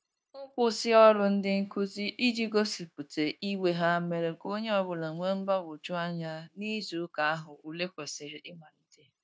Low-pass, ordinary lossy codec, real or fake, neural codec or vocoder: none; none; fake; codec, 16 kHz, 0.9 kbps, LongCat-Audio-Codec